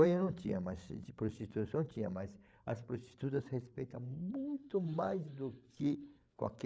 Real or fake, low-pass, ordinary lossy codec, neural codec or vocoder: fake; none; none; codec, 16 kHz, 8 kbps, FreqCodec, larger model